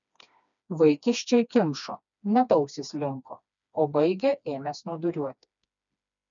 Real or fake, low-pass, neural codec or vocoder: fake; 7.2 kHz; codec, 16 kHz, 2 kbps, FreqCodec, smaller model